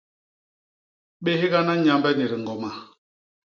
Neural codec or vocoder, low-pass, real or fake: none; 7.2 kHz; real